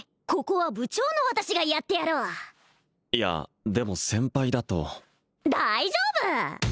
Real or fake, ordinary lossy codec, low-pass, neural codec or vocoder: real; none; none; none